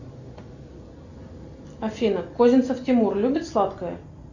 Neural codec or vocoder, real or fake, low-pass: none; real; 7.2 kHz